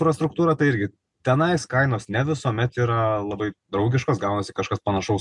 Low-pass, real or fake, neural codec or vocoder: 10.8 kHz; real; none